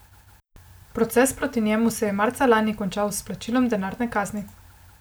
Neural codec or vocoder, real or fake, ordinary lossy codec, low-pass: none; real; none; none